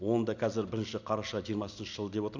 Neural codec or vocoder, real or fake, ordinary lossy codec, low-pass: none; real; none; 7.2 kHz